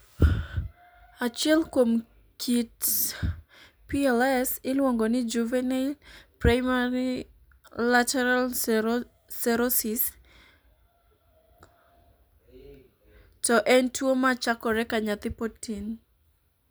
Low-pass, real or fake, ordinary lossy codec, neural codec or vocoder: none; real; none; none